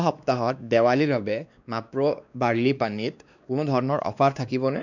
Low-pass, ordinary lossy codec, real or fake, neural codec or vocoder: 7.2 kHz; none; fake; codec, 16 kHz, 2 kbps, X-Codec, WavLM features, trained on Multilingual LibriSpeech